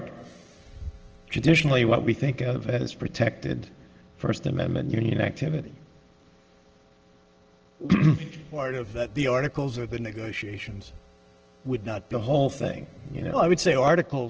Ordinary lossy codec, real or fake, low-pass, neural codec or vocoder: Opus, 16 kbps; real; 7.2 kHz; none